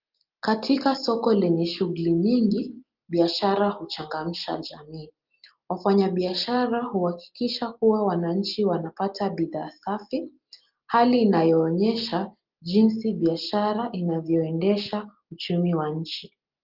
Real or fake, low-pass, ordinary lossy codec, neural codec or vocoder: real; 5.4 kHz; Opus, 32 kbps; none